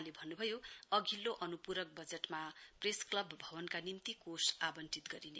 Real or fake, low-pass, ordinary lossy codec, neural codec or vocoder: real; none; none; none